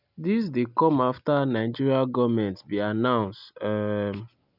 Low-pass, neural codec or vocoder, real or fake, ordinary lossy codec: 5.4 kHz; none; real; none